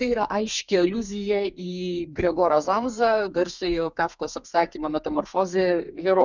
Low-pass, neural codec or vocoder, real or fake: 7.2 kHz; codec, 32 kHz, 1.9 kbps, SNAC; fake